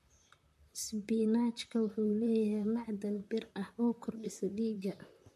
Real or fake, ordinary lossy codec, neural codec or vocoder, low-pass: fake; MP3, 64 kbps; vocoder, 44.1 kHz, 128 mel bands, Pupu-Vocoder; 14.4 kHz